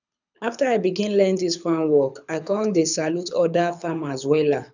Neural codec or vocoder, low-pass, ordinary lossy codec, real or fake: codec, 24 kHz, 6 kbps, HILCodec; 7.2 kHz; none; fake